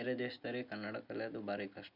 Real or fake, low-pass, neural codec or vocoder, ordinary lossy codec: real; 5.4 kHz; none; none